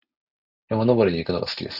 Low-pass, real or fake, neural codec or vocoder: 5.4 kHz; real; none